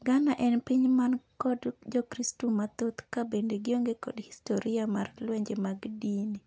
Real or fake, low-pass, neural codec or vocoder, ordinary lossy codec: real; none; none; none